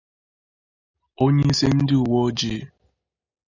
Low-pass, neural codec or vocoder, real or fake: 7.2 kHz; none; real